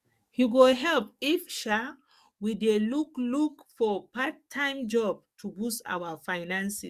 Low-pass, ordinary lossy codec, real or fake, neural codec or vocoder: 14.4 kHz; Opus, 64 kbps; fake; codec, 44.1 kHz, 7.8 kbps, DAC